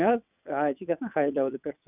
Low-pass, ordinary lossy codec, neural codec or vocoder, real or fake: 3.6 kHz; none; vocoder, 22.05 kHz, 80 mel bands, WaveNeXt; fake